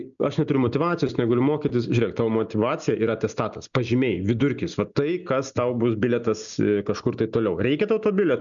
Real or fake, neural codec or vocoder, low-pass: real; none; 7.2 kHz